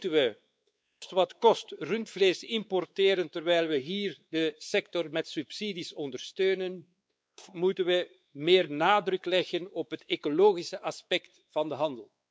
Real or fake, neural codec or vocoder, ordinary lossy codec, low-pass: fake; codec, 16 kHz, 4 kbps, X-Codec, WavLM features, trained on Multilingual LibriSpeech; none; none